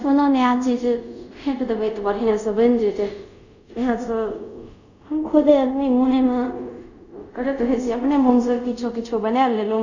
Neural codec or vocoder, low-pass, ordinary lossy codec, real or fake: codec, 24 kHz, 0.5 kbps, DualCodec; 7.2 kHz; none; fake